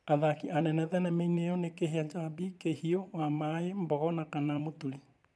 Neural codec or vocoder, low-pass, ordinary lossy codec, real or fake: vocoder, 22.05 kHz, 80 mel bands, Vocos; none; none; fake